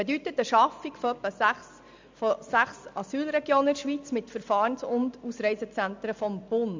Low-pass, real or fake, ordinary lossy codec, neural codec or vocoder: 7.2 kHz; real; MP3, 64 kbps; none